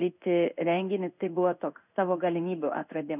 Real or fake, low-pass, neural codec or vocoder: fake; 3.6 kHz; codec, 16 kHz in and 24 kHz out, 1 kbps, XY-Tokenizer